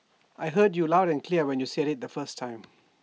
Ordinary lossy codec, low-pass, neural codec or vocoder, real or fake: none; none; none; real